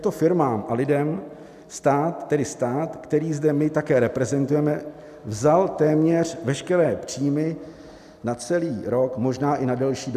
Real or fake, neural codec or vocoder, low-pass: real; none; 14.4 kHz